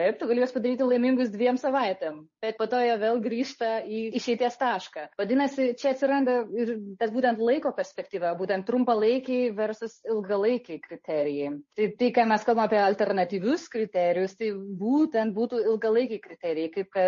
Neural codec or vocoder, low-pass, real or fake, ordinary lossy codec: codec, 16 kHz, 8 kbps, FunCodec, trained on Chinese and English, 25 frames a second; 7.2 kHz; fake; MP3, 32 kbps